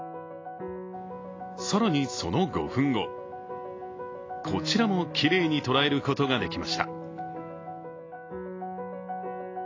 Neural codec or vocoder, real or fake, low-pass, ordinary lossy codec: none; real; 7.2 kHz; AAC, 32 kbps